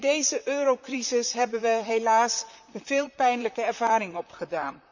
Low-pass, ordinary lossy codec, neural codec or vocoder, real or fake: 7.2 kHz; none; vocoder, 44.1 kHz, 128 mel bands, Pupu-Vocoder; fake